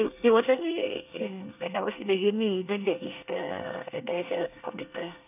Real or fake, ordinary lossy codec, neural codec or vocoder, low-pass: fake; none; codec, 24 kHz, 1 kbps, SNAC; 3.6 kHz